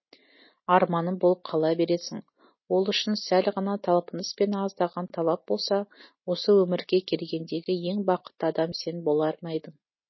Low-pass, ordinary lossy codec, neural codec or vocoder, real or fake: 7.2 kHz; MP3, 24 kbps; none; real